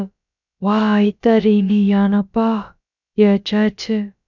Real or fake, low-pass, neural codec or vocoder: fake; 7.2 kHz; codec, 16 kHz, about 1 kbps, DyCAST, with the encoder's durations